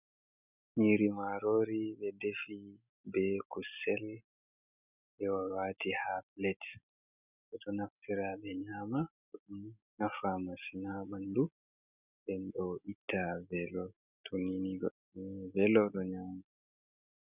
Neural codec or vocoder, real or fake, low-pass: none; real; 3.6 kHz